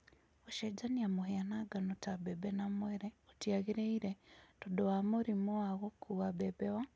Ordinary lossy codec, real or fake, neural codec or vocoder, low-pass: none; real; none; none